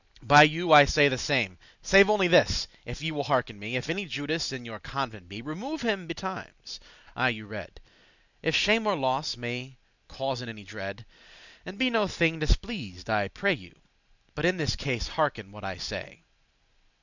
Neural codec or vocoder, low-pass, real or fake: none; 7.2 kHz; real